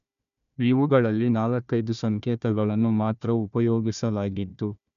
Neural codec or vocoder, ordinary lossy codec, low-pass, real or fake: codec, 16 kHz, 1 kbps, FunCodec, trained on Chinese and English, 50 frames a second; none; 7.2 kHz; fake